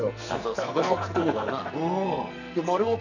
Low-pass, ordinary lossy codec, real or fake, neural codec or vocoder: 7.2 kHz; none; fake; codec, 44.1 kHz, 2.6 kbps, SNAC